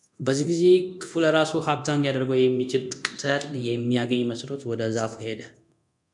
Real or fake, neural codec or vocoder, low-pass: fake; codec, 24 kHz, 0.9 kbps, DualCodec; 10.8 kHz